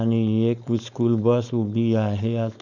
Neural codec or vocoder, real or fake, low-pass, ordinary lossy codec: codec, 16 kHz, 4.8 kbps, FACodec; fake; 7.2 kHz; none